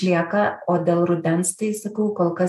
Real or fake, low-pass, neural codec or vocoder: real; 14.4 kHz; none